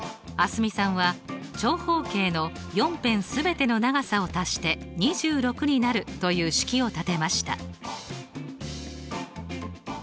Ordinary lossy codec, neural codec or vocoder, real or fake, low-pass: none; none; real; none